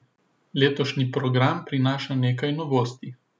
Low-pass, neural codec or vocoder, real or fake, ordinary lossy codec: none; none; real; none